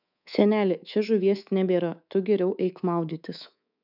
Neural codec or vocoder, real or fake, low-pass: codec, 24 kHz, 3.1 kbps, DualCodec; fake; 5.4 kHz